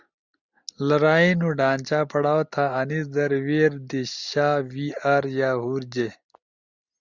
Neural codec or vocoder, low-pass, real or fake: none; 7.2 kHz; real